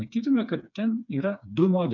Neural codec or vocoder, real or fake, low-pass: codec, 16 kHz, 4 kbps, FreqCodec, smaller model; fake; 7.2 kHz